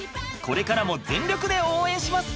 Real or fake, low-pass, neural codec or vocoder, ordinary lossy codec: real; none; none; none